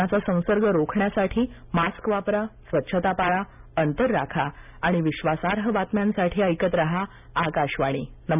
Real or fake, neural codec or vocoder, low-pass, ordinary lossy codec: real; none; 3.6 kHz; none